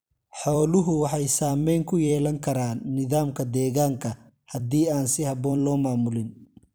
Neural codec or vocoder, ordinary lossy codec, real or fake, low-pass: vocoder, 44.1 kHz, 128 mel bands every 256 samples, BigVGAN v2; none; fake; none